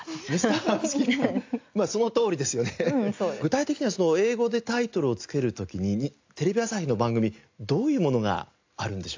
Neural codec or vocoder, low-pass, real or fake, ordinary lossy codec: none; 7.2 kHz; real; AAC, 48 kbps